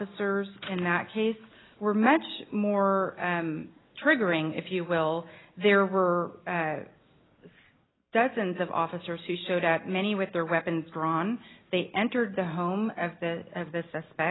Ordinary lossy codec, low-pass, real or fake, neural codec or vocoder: AAC, 16 kbps; 7.2 kHz; real; none